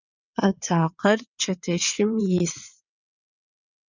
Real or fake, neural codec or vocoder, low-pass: fake; vocoder, 22.05 kHz, 80 mel bands, WaveNeXt; 7.2 kHz